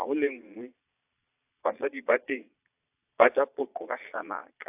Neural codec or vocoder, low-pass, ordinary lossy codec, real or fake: vocoder, 22.05 kHz, 80 mel bands, Vocos; 3.6 kHz; AAC, 32 kbps; fake